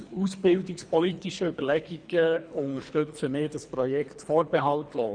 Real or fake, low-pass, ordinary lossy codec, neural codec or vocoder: fake; 9.9 kHz; none; codec, 24 kHz, 3 kbps, HILCodec